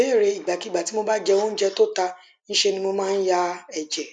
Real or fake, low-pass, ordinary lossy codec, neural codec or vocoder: real; 9.9 kHz; none; none